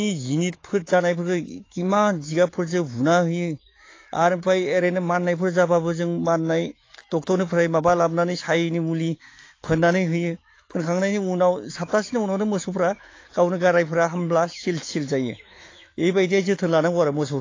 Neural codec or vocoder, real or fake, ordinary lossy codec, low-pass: autoencoder, 48 kHz, 128 numbers a frame, DAC-VAE, trained on Japanese speech; fake; AAC, 32 kbps; 7.2 kHz